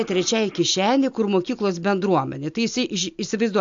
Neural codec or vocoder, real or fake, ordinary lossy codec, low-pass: none; real; AAC, 64 kbps; 7.2 kHz